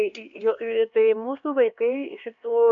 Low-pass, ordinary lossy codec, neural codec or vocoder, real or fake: 7.2 kHz; MP3, 64 kbps; codec, 16 kHz, 2 kbps, X-Codec, HuBERT features, trained on LibriSpeech; fake